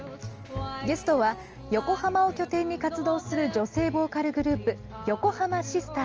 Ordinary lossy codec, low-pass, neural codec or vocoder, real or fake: Opus, 24 kbps; 7.2 kHz; none; real